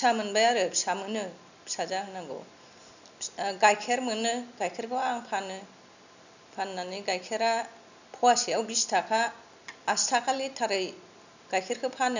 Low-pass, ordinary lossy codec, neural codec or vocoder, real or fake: 7.2 kHz; none; none; real